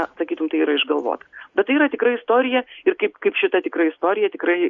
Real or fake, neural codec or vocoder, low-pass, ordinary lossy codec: real; none; 7.2 kHz; Opus, 64 kbps